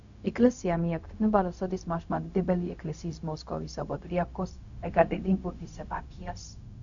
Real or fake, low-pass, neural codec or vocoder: fake; 7.2 kHz; codec, 16 kHz, 0.4 kbps, LongCat-Audio-Codec